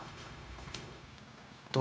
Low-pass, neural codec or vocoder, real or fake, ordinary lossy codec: none; codec, 16 kHz, 0.9 kbps, LongCat-Audio-Codec; fake; none